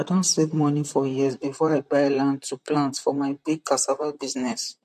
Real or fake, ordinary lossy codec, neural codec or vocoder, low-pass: fake; MP3, 64 kbps; vocoder, 44.1 kHz, 128 mel bands, Pupu-Vocoder; 14.4 kHz